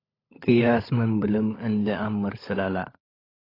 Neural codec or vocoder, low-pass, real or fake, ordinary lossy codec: codec, 16 kHz, 16 kbps, FunCodec, trained on LibriTTS, 50 frames a second; 5.4 kHz; fake; AAC, 24 kbps